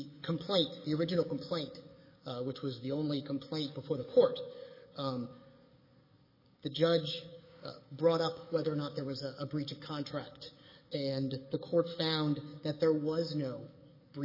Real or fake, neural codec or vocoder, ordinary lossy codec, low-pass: fake; codec, 44.1 kHz, 7.8 kbps, DAC; MP3, 24 kbps; 5.4 kHz